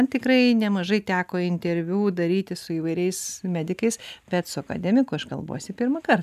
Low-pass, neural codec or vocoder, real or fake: 14.4 kHz; none; real